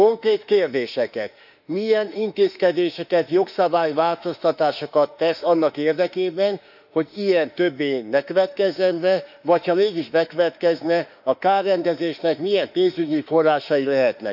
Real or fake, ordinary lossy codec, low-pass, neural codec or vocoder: fake; none; 5.4 kHz; autoencoder, 48 kHz, 32 numbers a frame, DAC-VAE, trained on Japanese speech